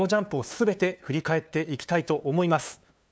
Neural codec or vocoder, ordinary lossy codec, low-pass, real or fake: codec, 16 kHz, 2 kbps, FunCodec, trained on LibriTTS, 25 frames a second; none; none; fake